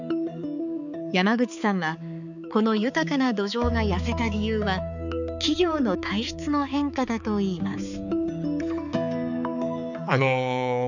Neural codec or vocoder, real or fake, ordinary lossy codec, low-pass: codec, 16 kHz, 4 kbps, X-Codec, HuBERT features, trained on balanced general audio; fake; none; 7.2 kHz